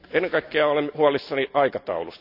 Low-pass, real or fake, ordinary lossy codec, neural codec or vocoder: 5.4 kHz; real; none; none